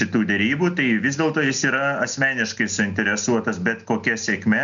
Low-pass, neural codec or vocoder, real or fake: 7.2 kHz; none; real